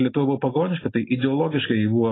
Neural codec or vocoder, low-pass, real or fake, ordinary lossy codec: none; 7.2 kHz; real; AAC, 16 kbps